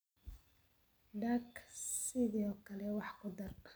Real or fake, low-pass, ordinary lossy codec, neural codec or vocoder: real; none; none; none